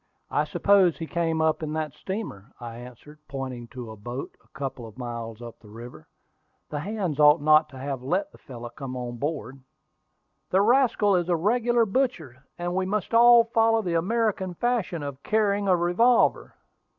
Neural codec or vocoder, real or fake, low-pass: none; real; 7.2 kHz